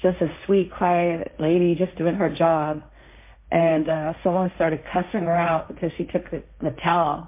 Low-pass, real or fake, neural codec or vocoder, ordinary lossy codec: 3.6 kHz; fake; codec, 16 kHz, 1.1 kbps, Voila-Tokenizer; MP3, 24 kbps